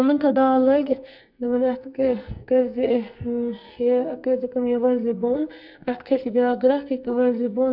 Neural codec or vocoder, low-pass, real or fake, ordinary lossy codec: codec, 44.1 kHz, 2.6 kbps, SNAC; 5.4 kHz; fake; none